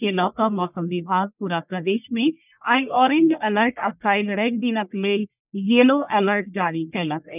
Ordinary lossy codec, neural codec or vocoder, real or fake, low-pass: none; codec, 44.1 kHz, 1.7 kbps, Pupu-Codec; fake; 3.6 kHz